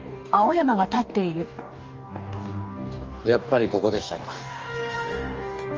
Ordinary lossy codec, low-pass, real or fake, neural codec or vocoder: Opus, 24 kbps; 7.2 kHz; fake; codec, 44.1 kHz, 2.6 kbps, DAC